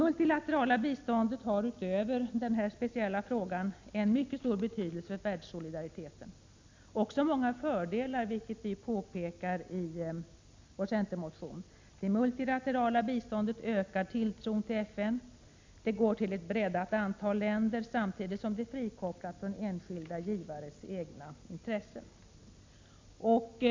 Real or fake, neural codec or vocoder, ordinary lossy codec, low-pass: real; none; MP3, 64 kbps; 7.2 kHz